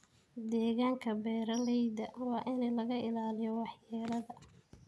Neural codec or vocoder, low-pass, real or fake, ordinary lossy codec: none; none; real; none